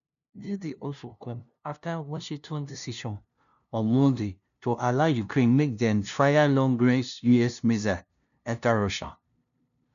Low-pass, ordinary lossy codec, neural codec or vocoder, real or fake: 7.2 kHz; none; codec, 16 kHz, 0.5 kbps, FunCodec, trained on LibriTTS, 25 frames a second; fake